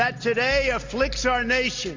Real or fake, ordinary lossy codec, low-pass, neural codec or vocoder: real; MP3, 48 kbps; 7.2 kHz; none